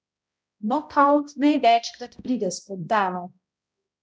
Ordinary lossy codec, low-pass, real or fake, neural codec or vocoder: none; none; fake; codec, 16 kHz, 0.5 kbps, X-Codec, HuBERT features, trained on balanced general audio